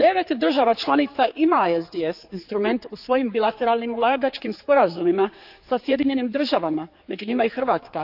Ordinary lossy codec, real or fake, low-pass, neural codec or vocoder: AAC, 48 kbps; fake; 5.4 kHz; codec, 16 kHz, 4 kbps, X-Codec, HuBERT features, trained on general audio